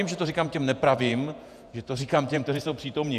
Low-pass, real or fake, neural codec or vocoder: 14.4 kHz; fake; vocoder, 48 kHz, 128 mel bands, Vocos